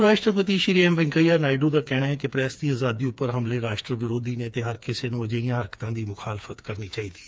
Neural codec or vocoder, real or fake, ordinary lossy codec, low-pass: codec, 16 kHz, 4 kbps, FreqCodec, smaller model; fake; none; none